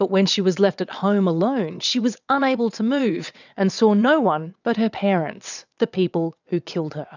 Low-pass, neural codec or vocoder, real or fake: 7.2 kHz; vocoder, 22.05 kHz, 80 mel bands, WaveNeXt; fake